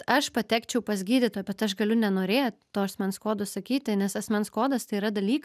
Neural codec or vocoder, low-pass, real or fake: none; 14.4 kHz; real